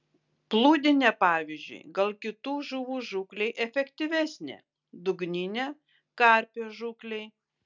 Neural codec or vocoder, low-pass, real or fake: none; 7.2 kHz; real